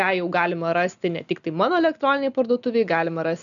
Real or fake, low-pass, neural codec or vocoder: real; 7.2 kHz; none